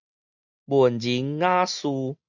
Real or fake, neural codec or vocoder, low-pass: real; none; 7.2 kHz